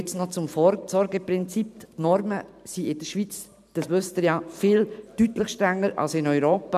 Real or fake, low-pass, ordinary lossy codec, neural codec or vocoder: fake; 14.4 kHz; none; vocoder, 44.1 kHz, 128 mel bands every 512 samples, BigVGAN v2